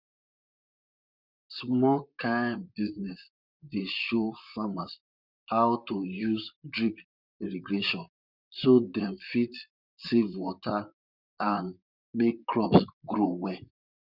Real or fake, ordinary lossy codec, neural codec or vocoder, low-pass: fake; none; vocoder, 44.1 kHz, 128 mel bands, Pupu-Vocoder; 5.4 kHz